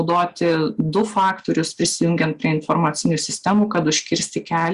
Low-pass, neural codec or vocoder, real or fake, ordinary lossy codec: 10.8 kHz; none; real; Opus, 64 kbps